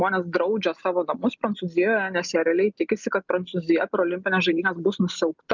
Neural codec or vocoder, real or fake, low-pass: none; real; 7.2 kHz